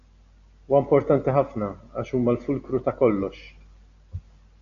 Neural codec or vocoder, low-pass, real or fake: none; 7.2 kHz; real